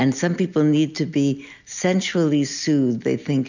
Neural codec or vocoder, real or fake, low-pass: none; real; 7.2 kHz